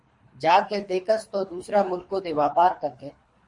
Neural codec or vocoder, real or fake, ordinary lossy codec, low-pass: codec, 24 kHz, 3 kbps, HILCodec; fake; MP3, 48 kbps; 10.8 kHz